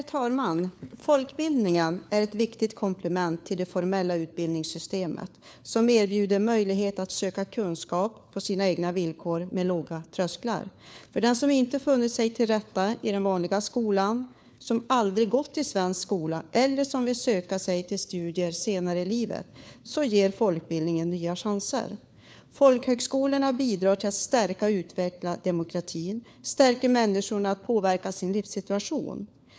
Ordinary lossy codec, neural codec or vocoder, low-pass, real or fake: none; codec, 16 kHz, 4 kbps, FunCodec, trained on LibriTTS, 50 frames a second; none; fake